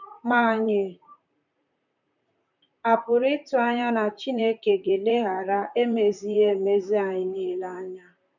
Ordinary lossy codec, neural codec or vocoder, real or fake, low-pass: none; vocoder, 44.1 kHz, 128 mel bands, Pupu-Vocoder; fake; 7.2 kHz